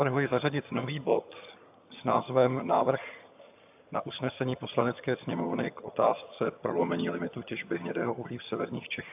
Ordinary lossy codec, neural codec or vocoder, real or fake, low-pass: AAC, 32 kbps; vocoder, 22.05 kHz, 80 mel bands, HiFi-GAN; fake; 3.6 kHz